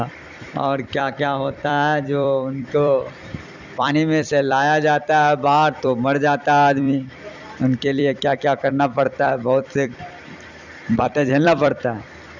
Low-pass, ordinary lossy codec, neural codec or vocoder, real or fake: 7.2 kHz; none; vocoder, 44.1 kHz, 128 mel bands every 256 samples, BigVGAN v2; fake